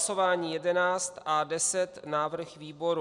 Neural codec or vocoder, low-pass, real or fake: none; 10.8 kHz; real